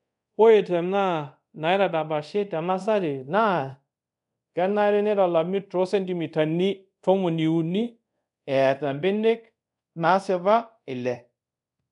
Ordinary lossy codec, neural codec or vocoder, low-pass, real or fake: none; codec, 24 kHz, 0.5 kbps, DualCodec; 10.8 kHz; fake